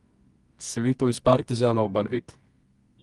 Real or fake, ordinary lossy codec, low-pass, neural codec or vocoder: fake; Opus, 24 kbps; 10.8 kHz; codec, 24 kHz, 0.9 kbps, WavTokenizer, medium music audio release